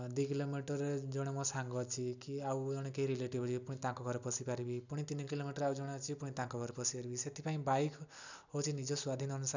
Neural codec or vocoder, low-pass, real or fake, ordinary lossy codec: none; 7.2 kHz; real; none